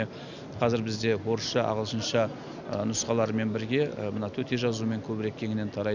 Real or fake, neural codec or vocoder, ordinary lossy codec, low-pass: real; none; none; 7.2 kHz